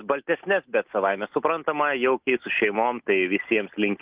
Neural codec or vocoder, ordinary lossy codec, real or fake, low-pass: none; Opus, 32 kbps; real; 3.6 kHz